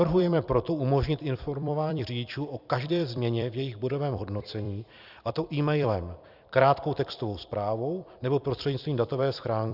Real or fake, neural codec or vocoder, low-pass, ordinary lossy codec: fake; vocoder, 44.1 kHz, 128 mel bands every 256 samples, BigVGAN v2; 5.4 kHz; Opus, 64 kbps